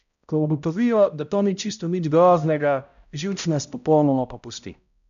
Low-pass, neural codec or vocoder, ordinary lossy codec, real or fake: 7.2 kHz; codec, 16 kHz, 0.5 kbps, X-Codec, HuBERT features, trained on balanced general audio; AAC, 96 kbps; fake